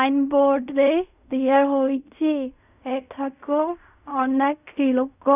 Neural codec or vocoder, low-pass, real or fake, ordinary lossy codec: codec, 16 kHz in and 24 kHz out, 0.4 kbps, LongCat-Audio-Codec, fine tuned four codebook decoder; 3.6 kHz; fake; none